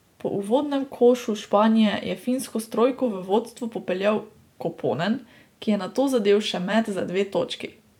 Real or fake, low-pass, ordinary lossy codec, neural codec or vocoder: fake; 19.8 kHz; none; vocoder, 44.1 kHz, 128 mel bands every 512 samples, BigVGAN v2